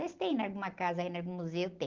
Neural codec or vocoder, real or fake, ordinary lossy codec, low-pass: none; real; Opus, 16 kbps; 7.2 kHz